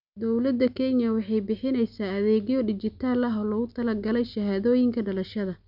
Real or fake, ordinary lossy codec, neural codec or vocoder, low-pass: real; none; none; 5.4 kHz